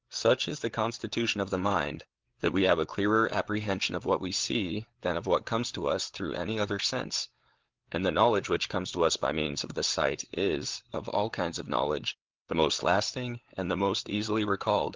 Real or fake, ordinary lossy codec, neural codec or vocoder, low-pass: fake; Opus, 16 kbps; codec, 16 kHz, 8 kbps, FreqCodec, larger model; 7.2 kHz